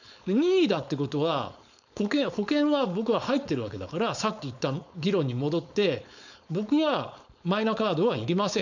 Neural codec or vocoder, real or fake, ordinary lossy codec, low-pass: codec, 16 kHz, 4.8 kbps, FACodec; fake; none; 7.2 kHz